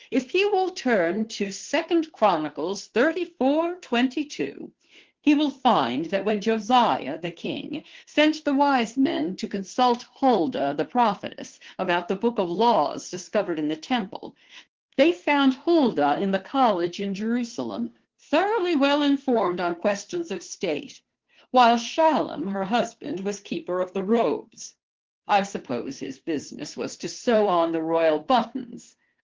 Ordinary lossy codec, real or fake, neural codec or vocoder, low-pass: Opus, 16 kbps; fake; codec, 16 kHz, 2 kbps, FunCodec, trained on Chinese and English, 25 frames a second; 7.2 kHz